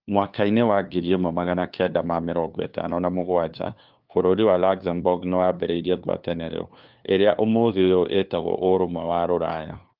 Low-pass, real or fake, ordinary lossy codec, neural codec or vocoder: 5.4 kHz; fake; Opus, 24 kbps; codec, 16 kHz, 2 kbps, FunCodec, trained on LibriTTS, 25 frames a second